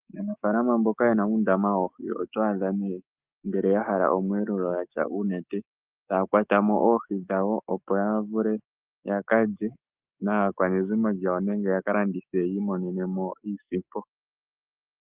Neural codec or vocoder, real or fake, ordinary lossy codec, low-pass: autoencoder, 48 kHz, 128 numbers a frame, DAC-VAE, trained on Japanese speech; fake; Opus, 32 kbps; 3.6 kHz